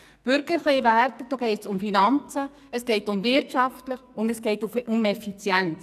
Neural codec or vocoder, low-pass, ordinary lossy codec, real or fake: codec, 44.1 kHz, 2.6 kbps, SNAC; 14.4 kHz; none; fake